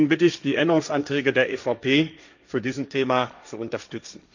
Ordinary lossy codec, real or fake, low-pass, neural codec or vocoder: none; fake; 7.2 kHz; codec, 16 kHz, 1.1 kbps, Voila-Tokenizer